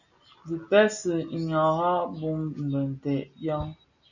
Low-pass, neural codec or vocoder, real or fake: 7.2 kHz; none; real